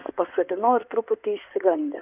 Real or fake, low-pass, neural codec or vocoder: fake; 3.6 kHz; codec, 16 kHz in and 24 kHz out, 2.2 kbps, FireRedTTS-2 codec